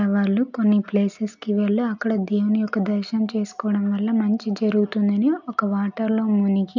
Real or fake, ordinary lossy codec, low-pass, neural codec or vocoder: real; none; 7.2 kHz; none